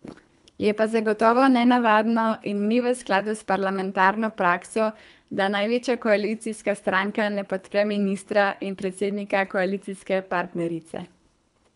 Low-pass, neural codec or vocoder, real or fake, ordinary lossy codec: 10.8 kHz; codec, 24 kHz, 3 kbps, HILCodec; fake; none